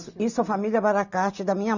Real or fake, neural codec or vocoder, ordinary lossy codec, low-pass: real; none; none; 7.2 kHz